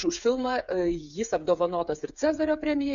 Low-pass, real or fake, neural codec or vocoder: 7.2 kHz; fake; codec, 16 kHz, 8 kbps, FreqCodec, smaller model